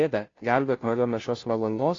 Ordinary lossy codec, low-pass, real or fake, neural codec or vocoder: AAC, 32 kbps; 7.2 kHz; fake; codec, 16 kHz, 0.5 kbps, FunCodec, trained on Chinese and English, 25 frames a second